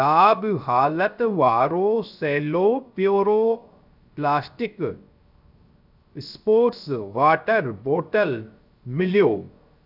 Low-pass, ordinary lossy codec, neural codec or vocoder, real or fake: 5.4 kHz; none; codec, 16 kHz, 0.3 kbps, FocalCodec; fake